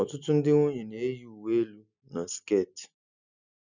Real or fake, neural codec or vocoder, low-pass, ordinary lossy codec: real; none; 7.2 kHz; none